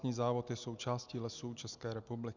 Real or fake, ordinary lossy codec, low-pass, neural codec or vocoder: real; Opus, 64 kbps; 7.2 kHz; none